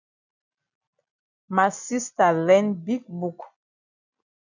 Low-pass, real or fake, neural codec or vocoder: 7.2 kHz; real; none